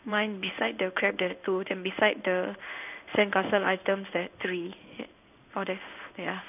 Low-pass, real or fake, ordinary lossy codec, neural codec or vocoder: 3.6 kHz; fake; none; codec, 16 kHz in and 24 kHz out, 1 kbps, XY-Tokenizer